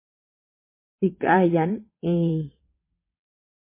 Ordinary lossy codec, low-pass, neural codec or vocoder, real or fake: MP3, 24 kbps; 3.6 kHz; vocoder, 22.05 kHz, 80 mel bands, WaveNeXt; fake